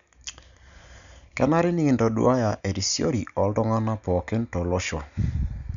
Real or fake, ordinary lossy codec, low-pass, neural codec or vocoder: real; none; 7.2 kHz; none